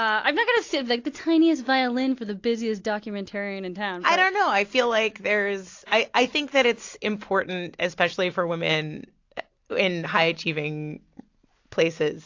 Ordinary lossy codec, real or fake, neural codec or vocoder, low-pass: AAC, 48 kbps; real; none; 7.2 kHz